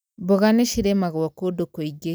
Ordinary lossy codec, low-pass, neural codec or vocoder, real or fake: none; none; none; real